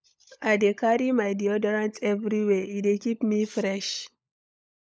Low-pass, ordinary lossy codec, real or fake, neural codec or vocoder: none; none; fake; codec, 16 kHz, 16 kbps, FunCodec, trained on LibriTTS, 50 frames a second